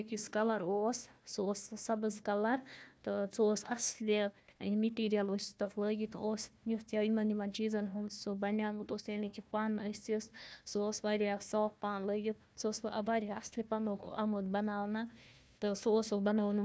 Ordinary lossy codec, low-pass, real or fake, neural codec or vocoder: none; none; fake; codec, 16 kHz, 1 kbps, FunCodec, trained on Chinese and English, 50 frames a second